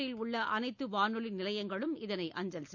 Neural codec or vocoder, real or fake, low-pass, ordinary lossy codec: none; real; 7.2 kHz; none